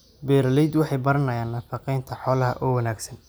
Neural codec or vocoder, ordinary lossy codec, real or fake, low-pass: none; none; real; none